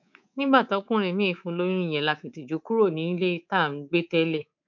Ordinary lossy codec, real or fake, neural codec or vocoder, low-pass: none; fake; codec, 24 kHz, 3.1 kbps, DualCodec; 7.2 kHz